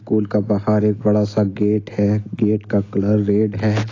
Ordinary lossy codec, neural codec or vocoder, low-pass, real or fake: AAC, 32 kbps; none; 7.2 kHz; real